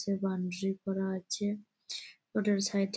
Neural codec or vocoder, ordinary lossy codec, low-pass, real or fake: none; none; none; real